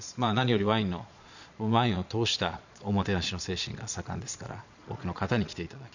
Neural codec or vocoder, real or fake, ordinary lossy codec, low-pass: vocoder, 22.05 kHz, 80 mel bands, Vocos; fake; none; 7.2 kHz